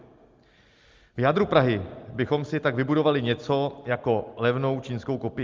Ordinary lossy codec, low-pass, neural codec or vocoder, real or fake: Opus, 32 kbps; 7.2 kHz; none; real